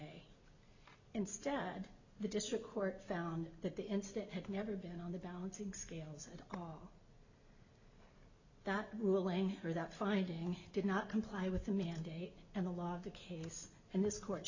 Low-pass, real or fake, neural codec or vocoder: 7.2 kHz; real; none